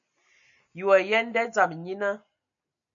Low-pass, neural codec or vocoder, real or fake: 7.2 kHz; none; real